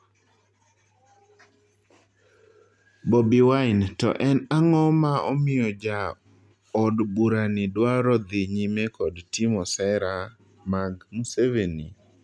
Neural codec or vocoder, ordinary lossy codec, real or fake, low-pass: none; none; real; none